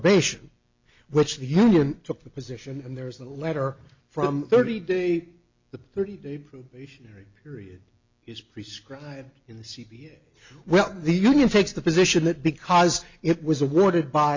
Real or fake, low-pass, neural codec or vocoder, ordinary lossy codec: real; 7.2 kHz; none; MP3, 64 kbps